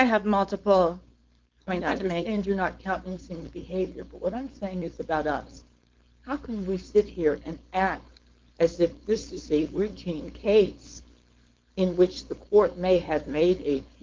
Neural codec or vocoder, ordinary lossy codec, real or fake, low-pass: codec, 16 kHz, 4.8 kbps, FACodec; Opus, 32 kbps; fake; 7.2 kHz